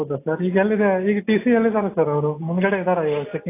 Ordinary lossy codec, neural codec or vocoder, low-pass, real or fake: AAC, 24 kbps; none; 3.6 kHz; real